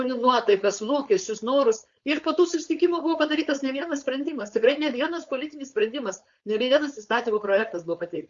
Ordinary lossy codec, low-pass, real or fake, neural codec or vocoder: Opus, 64 kbps; 7.2 kHz; fake; codec, 16 kHz, 4.8 kbps, FACodec